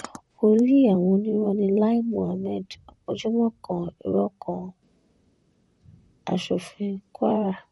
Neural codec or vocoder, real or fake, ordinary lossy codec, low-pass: vocoder, 44.1 kHz, 128 mel bands, Pupu-Vocoder; fake; MP3, 48 kbps; 19.8 kHz